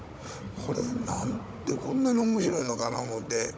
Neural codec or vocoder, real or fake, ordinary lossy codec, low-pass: codec, 16 kHz, 16 kbps, FunCodec, trained on Chinese and English, 50 frames a second; fake; none; none